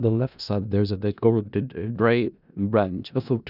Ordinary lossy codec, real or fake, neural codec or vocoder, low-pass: none; fake; codec, 16 kHz in and 24 kHz out, 0.4 kbps, LongCat-Audio-Codec, four codebook decoder; 5.4 kHz